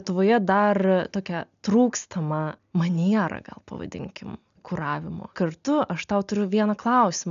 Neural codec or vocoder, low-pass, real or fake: none; 7.2 kHz; real